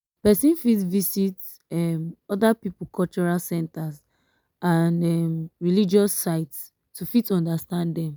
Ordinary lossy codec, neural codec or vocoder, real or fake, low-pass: none; none; real; none